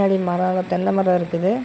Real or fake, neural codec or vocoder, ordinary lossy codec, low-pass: fake; codec, 16 kHz, 16 kbps, FunCodec, trained on LibriTTS, 50 frames a second; none; none